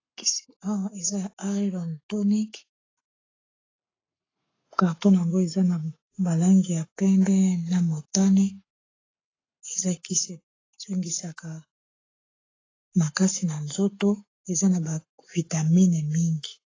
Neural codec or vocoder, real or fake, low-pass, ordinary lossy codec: codec, 44.1 kHz, 7.8 kbps, Pupu-Codec; fake; 7.2 kHz; AAC, 32 kbps